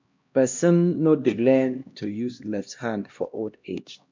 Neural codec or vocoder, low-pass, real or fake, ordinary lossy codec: codec, 16 kHz, 1 kbps, X-Codec, HuBERT features, trained on LibriSpeech; 7.2 kHz; fake; MP3, 64 kbps